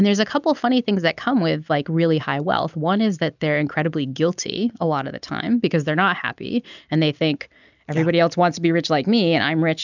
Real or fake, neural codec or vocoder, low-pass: real; none; 7.2 kHz